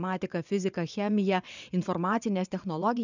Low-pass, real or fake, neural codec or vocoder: 7.2 kHz; fake; vocoder, 22.05 kHz, 80 mel bands, WaveNeXt